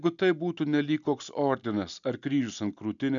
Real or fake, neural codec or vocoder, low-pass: real; none; 7.2 kHz